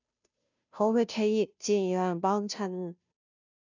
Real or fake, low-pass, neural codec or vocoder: fake; 7.2 kHz; codec, 16 kHz, 0.5 kbps, FunCodec, trained on Chinese and English, 25 frames a second